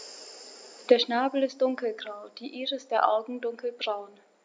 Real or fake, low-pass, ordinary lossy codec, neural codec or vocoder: real; none; none; none